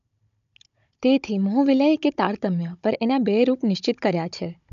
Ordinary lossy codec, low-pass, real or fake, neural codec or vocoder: none; 7.2 kHz; fake; codec, 16 kHz, 16 kbps, FunCodec, trained on Chinese and English, 50 frames a second